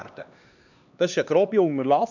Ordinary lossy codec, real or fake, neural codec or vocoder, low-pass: none; fake; codec, 16 kHz, 2 kbps, X-Codec, HuBERT features, trained on LibriSpeech; 7.2 kHz